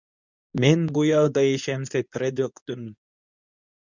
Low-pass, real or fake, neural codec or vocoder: 7.2 kHz; fake; codec, 24 kHz, 0.9 kbps, WavTokenizer, medium speech release version 2